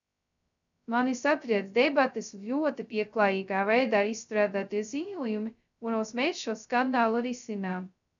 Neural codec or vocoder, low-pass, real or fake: codec, 16 kHz, 0.2 kbps, FocalCodec; 7.2 kHz; fake